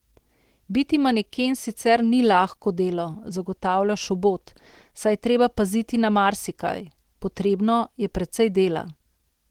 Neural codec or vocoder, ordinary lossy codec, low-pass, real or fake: none; Opus, 16 kbps; 19.8 kHz; real